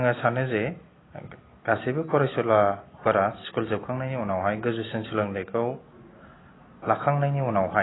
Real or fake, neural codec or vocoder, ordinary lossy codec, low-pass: real; none; AAC, 16 kbps; 7.2 kHz